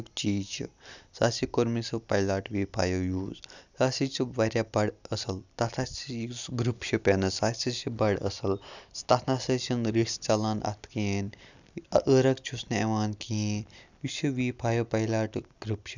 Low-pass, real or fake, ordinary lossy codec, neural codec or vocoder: 7.2 kHz; real; none; none